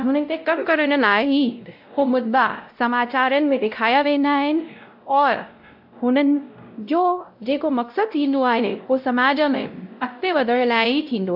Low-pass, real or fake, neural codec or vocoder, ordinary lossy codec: 5.4 kHz; fake; codec, 16 kHz, 0.5 kbps, X-Codec, WavLM features, trained on Multilingual LibriSpeech; AAC, 48 kbps